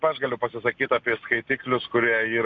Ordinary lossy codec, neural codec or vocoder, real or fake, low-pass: AAC, 64 kbps; none; real; 7.2 kHz